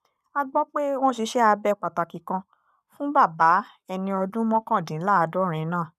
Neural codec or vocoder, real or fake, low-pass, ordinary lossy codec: codec, 44.1 kHz, 7.8 kbps, Pupu-Codec; fake; 14.4 kHz; none